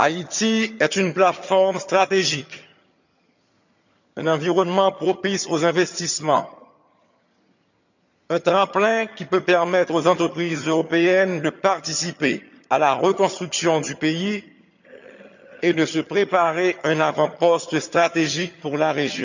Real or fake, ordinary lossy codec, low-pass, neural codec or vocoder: fake; none; 7.2 kHz; vocoder, 22.05 kHz, 80 mel bands, HiFi-GAN